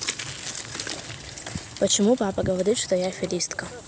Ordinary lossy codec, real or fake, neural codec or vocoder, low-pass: none; real; none; none